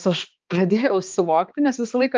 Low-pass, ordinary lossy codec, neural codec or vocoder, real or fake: 7.2 kHz; Opus, 24 kbps; codec, 16 kHz, 2 kbps, X-Codec, HuBERT features, trained on balanced general audio; fake